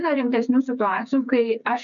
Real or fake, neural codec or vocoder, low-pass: fake; codec, 16 kHz, 4 kbps, FreqCodec, smaller model; 7.2 kHz